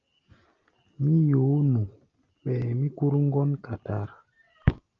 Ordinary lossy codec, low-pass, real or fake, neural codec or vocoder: Opus, 24 kbps; 7.2 kHz; real; none